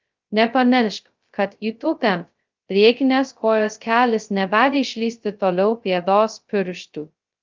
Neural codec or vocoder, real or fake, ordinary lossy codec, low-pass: codec, 16 kHz, 0.2 kbps, FocalCodec; fake; Opus, 32 kbps; 7.2 kHz